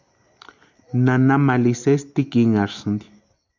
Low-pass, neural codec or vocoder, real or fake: 7.2 kHz; none; real